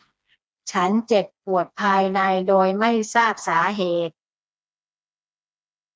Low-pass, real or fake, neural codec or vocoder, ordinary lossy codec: none; fake; codec, 16 kHz, 2 kbps, FreqCodec, smaller model; none